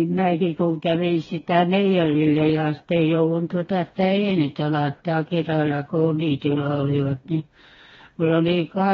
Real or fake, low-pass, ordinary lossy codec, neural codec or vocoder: fake; 7.2 kHz; AAC, 24 kbps; codec, 16 kHz, 2 kbps, FreqCodec, smaller model